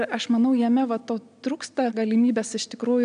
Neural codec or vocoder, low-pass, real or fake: none; 9.9 kHz; real